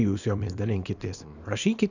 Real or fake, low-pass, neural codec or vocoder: fake; 7.2 kHz; codec, 24 kHz, 0.9 kbps, WavTokenizer, small release